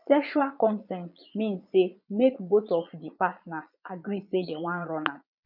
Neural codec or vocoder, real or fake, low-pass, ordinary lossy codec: vocoder, 22.05 kHz, 80 mel bands, Vocos; fake; 5.4 kHz; none